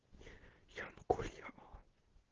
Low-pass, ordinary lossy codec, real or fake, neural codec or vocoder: 7.2 kHz; Opus, 16 kbps; real; none